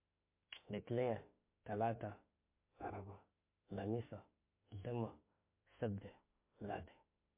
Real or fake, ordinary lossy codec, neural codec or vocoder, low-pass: fake; MP3, 24 kbps; autoencoder, 48 kHz, 32 numbers a frame, DAC-VAE, trained on Japanese speech; 3.6 kHz